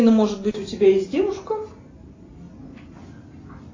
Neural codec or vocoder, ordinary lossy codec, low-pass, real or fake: none; AAC, 32 kbps; 7.2 kHz; real